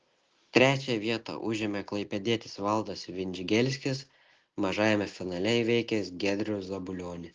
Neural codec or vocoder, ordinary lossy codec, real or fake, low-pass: none; Opus, 16 kbps; real; 7.2 kHz